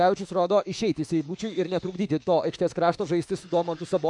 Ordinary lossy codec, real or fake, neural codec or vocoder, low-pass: MP3, 96 kbps; fake; autoencoder, 48 kHz, 32 numbers a frame, DAC-VAE, trained on Japanese speech; 10.8 kHz